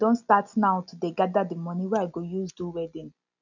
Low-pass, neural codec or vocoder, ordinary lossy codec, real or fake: 7.2 kHz; none; none; real